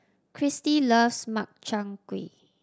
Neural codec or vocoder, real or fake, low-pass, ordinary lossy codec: none; real; none; none